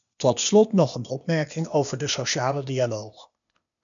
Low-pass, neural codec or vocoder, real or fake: 7.2 kHz; codec, 16 kHz, 0.8 kbps, ZipCodec; fake